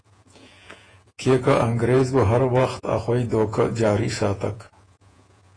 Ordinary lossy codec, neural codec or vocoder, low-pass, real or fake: AAC, 32 kbps; vocoder, 48 kHz, 128 mel bands, Vocos; 9.9 kHz; fake